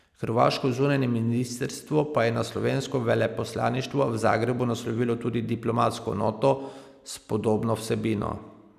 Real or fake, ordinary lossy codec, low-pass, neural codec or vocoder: real; none; 14.4 kHz; none